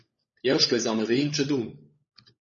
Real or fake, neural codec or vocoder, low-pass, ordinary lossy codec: fake; codec, 16 kHz, 16 kbps, FunCodec, trained on LibriTTS, 50 frames a second; 7.2 kHz; MP3, 32 kbps